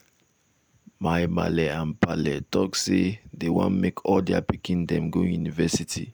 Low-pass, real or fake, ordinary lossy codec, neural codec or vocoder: none; real; none; none